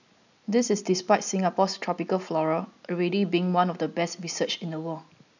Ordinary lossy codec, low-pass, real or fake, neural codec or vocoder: none; 7.2 kHz; real; none